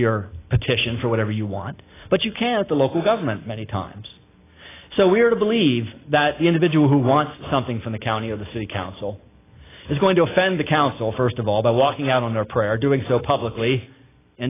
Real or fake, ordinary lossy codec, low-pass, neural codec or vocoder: real; AAC, 16 kbps; 3.6 kHz; none